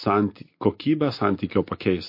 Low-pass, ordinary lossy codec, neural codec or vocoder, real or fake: 5.4 kHz; MP3, 32 kbps; none; real